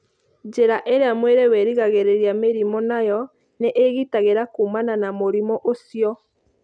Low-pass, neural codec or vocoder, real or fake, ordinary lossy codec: 9.9 kHz; none; real; none